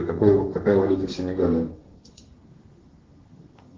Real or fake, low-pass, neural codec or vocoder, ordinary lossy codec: fake; 7.2 kHz; codec, 32 kHz, 1.9 kbps, SNAC; Opus, 16 kbps